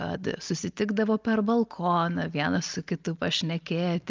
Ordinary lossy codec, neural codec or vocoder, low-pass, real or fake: Opus, 24 kbps; none; 7.2 kHz; real